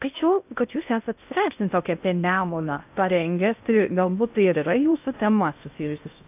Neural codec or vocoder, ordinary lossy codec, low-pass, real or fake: codec, 16 kHz in and 24 kHz out, 0.6 kbps, FocalCodec, streaming, 2048 codes; AAC, 32 kbps; 3.6 kHz; fake